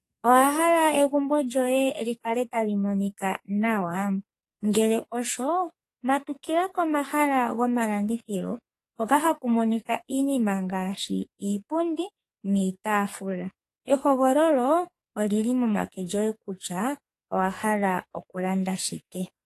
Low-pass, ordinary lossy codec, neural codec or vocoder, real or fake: 14.4 kHz; AAC, 48 kbps; codec, 44.1 kHz, 2.6 kbps, SNAC; fake